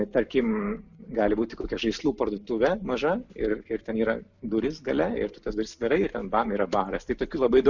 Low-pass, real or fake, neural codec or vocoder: 7.2 kHz; real; none